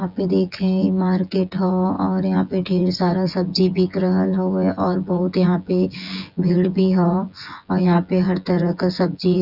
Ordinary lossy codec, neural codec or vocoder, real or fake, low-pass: none; vocoder, 24 kHz, 100 mel bands, Vocos; fake; 5.4 kHz